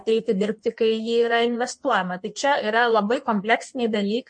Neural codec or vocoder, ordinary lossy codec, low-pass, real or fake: codec, 16 kHz in and 24 kHz out, 1.1 kbps, FireRedTTS-2 codec; MP3, 48 kbps; 9.9 kHz; fake